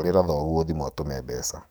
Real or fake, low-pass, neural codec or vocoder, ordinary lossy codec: fake; none; codec, 44.1 kHz, 7.8 kbps, DAC; none